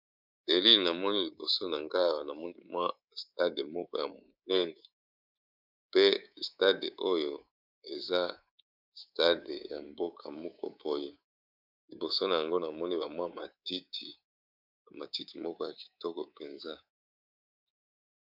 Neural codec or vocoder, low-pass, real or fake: codec, 24 kHz, 3.1 kbps, DualCodec; 5.4 kHz; fake